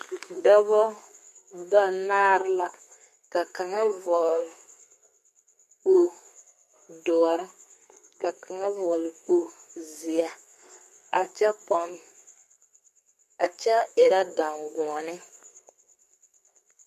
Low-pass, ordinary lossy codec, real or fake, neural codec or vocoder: 14.4 kHz; MP3, 64 kbps; fake; codec, 32 kHz, 1.9 kbps, SNAC